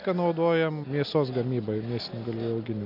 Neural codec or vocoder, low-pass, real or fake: none; 5.4 kHz; real